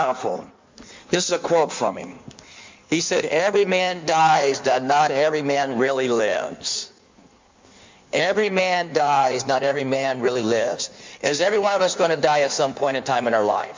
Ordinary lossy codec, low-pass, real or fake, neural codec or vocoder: MP3, 64 kbps; 7.2 kHz; fake; codec, 16 kHz in and 24 kHz out, 1.1 kbps, FireRedTTS-2 codec